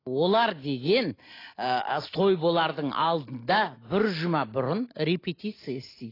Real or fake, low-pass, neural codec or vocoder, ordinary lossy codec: real; 5.4 kHz; none; AAC, 24 kbps